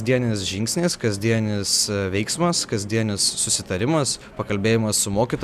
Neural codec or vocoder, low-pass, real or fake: none; 14.4 kHz; real